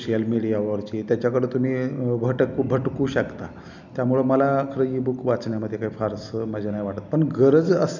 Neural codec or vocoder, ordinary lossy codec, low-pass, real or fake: none; none; 7.2 kHz; real